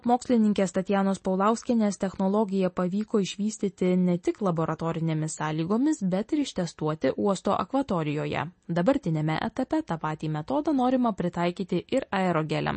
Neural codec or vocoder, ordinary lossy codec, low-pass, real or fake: none; MP3, 32 kbps; 10.8 kHz; real